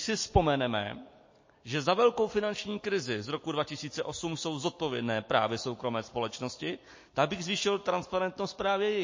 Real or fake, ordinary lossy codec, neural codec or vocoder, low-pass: real; MP3, 32 kbps; none; 7.2 kHz